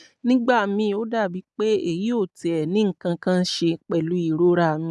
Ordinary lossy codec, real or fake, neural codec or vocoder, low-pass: none; real; none; none